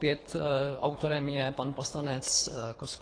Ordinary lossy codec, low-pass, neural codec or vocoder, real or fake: AAC, 32 kbps; 9.9 kHz; codec, 24 kHz, 3 kbps, HILCodec; fake